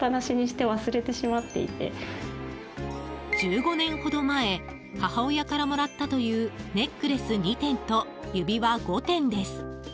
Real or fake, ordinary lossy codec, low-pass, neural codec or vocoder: real; none; none; none